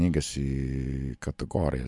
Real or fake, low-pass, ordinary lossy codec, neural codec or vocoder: real; 10.8 kHz; MP3, 64 kbps; none